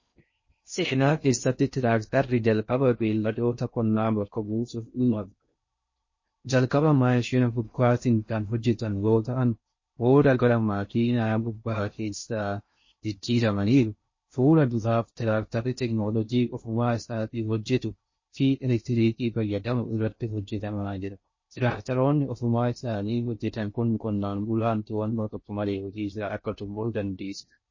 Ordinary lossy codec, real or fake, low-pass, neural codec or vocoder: MP3, 32 kbps; fake; 7.2 kHz; codec, 16 kHz in and 24 kHz out, 0.6 kbps, FocalCodec, streaming, 4096 codes